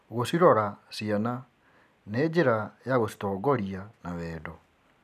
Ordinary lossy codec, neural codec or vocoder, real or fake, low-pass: none; none; real; 14.4 kHz